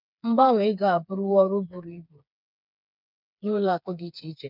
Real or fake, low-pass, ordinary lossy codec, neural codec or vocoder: fake; 5.4 kHz; none; codec, 16 kHz, 4 kbps, FreqCodec, smaller model